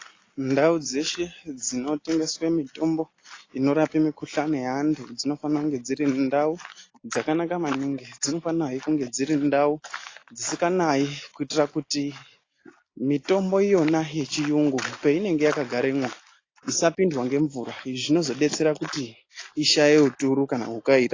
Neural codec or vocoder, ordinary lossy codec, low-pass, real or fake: none; AAC, 32 kbps; 7.2 kHz; real